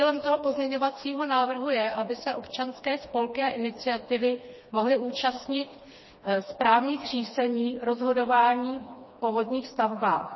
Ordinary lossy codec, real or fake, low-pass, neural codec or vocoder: MP3, 24 kbps; fake; 7.2 kHz; codec, 16 kHz, 2 kbps, FreqCodec, smaller model